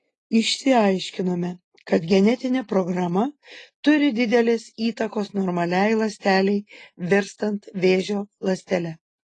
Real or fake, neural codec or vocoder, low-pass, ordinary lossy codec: real; none; 10.8 kHz; AAC, 32 kbps